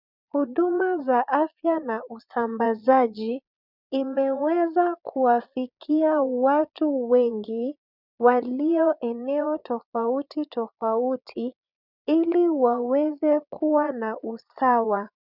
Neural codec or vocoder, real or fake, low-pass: vocoder, 22.05 kHz, 80 mel bands, Vocos; fake; 5.4 kHz